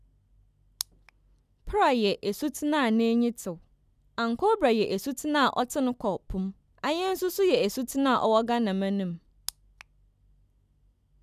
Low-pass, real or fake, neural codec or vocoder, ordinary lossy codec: 14.4 kHz; real; none; none